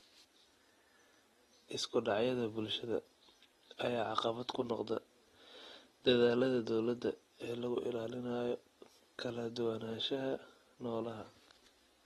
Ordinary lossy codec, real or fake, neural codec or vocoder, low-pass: AAC, 32 kbps; real; none; 19.8 kHz